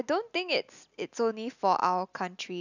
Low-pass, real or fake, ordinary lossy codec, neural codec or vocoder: 7.2 kHz; real; none; none